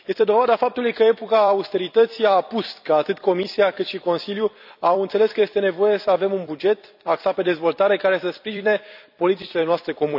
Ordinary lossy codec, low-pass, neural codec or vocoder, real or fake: none; 5.4 kHz; vocoder, 44.1 kHz, 128 mel bands every 256 samples, BigVGAN v2; fake